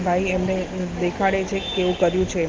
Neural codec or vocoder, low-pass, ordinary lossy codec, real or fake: none; 7.2 kHz; Opus, 16 kbps; real